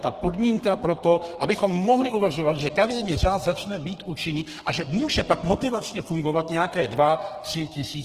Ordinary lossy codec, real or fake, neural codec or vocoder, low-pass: Opus, 16 kbps; fake; codec, 32 kHz, 1.9 kbps, SNAC; 14.4 kHz